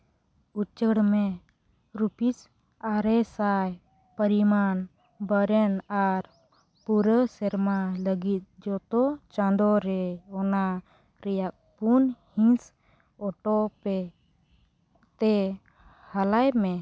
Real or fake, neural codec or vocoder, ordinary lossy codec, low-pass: real; none; none; none